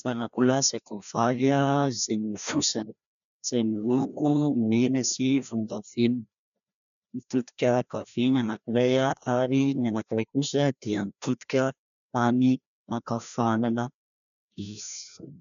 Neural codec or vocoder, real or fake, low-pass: codec, 16 kHz, 1 kbps, FreqCodec, larger model; fake; 7.2 kHz